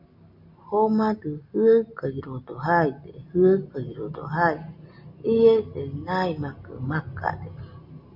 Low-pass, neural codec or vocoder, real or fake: 5.4 kHz; none; real